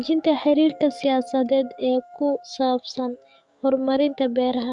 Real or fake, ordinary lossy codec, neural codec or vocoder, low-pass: fake; MP3, 96 kbps; codec, 44.1 kHz, 7.8 kbps, DAC; 10.8 kHz